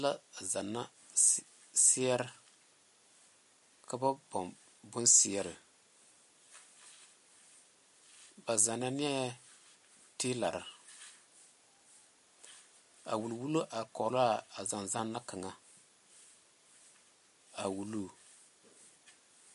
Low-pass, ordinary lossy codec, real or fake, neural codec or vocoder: 14.4 kHz; MP3, 48 kbps; real; none